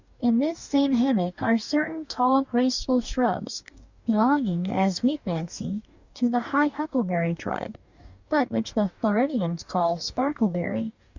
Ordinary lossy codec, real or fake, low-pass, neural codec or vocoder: Opus, 64 kbps; fake; 7.2 kHz; codec, 44.1 kHz, 2.6 kbps, DAC